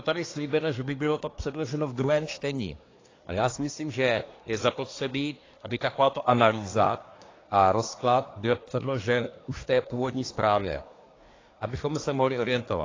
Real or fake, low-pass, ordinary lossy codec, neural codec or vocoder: fake; 7.2 kHz; AAC, 32 kbps; codec, 24 kHz, 1 kbps, SNAC